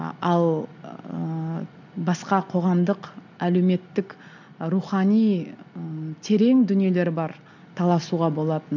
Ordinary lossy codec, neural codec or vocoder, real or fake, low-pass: none; none; real; 7.2 kHz